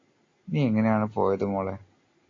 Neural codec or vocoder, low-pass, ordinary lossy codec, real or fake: none; 7.2 kHz; AAC, 32 kbps; real